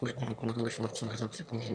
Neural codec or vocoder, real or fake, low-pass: autoencoder, 22.05 kHz, a latent of 192 numbers a frame, VITS, trained on one speaker; fake; 9.9 kHz